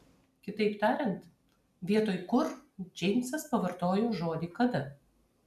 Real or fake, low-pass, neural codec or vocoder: real; 14.4 kHz; none